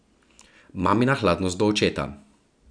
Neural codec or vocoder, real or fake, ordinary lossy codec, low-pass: none; real; none; 9.9 kHz